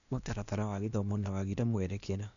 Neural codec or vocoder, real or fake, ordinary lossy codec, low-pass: codec, 16 kHz, 0.8 kbps, ZipCodec; fake; none; 7.2 kHz